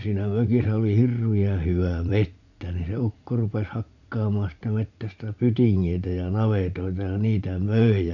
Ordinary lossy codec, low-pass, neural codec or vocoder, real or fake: none; 7.2 kHz; vocoder, 44.1 kHz, 80 mel bands, Vocos; fake